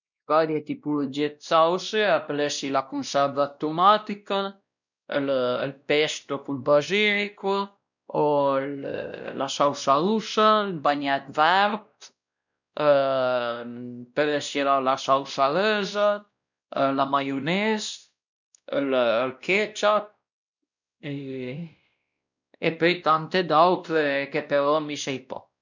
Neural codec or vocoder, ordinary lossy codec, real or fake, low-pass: codec, 16 kHz, 1 kbps, X-Codec, WavLM features, trained on Multilingual LibriSpeech; none; fake; 7.2 kHz